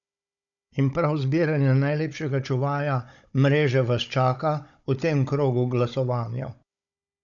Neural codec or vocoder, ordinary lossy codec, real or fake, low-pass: codec, 16 kHz, 16 kbps, FunCodec, trained on Chinese and English, 50 frames a second; none; fake; 7.2 kHz